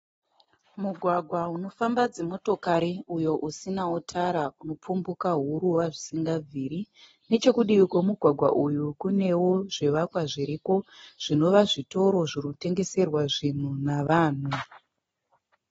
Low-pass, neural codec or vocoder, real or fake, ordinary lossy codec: 14.4 kHz; none; real; AAC, 24 kbps